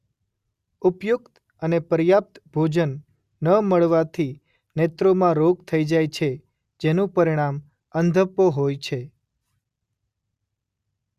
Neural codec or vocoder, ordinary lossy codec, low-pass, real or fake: none; Opus, 64 kbps; 14.4 kHz; real